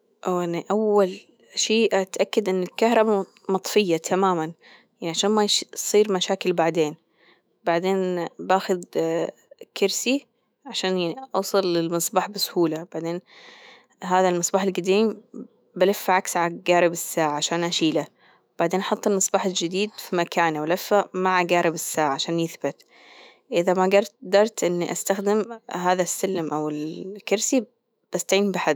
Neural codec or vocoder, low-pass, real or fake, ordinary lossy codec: autoencoder, 48 kHz, 128 numbers a frame, DAC-VAE, trained on Japanese speech; none; fake; none